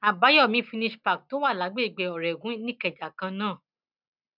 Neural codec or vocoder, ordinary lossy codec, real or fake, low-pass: none; none; real; 5.4 kHz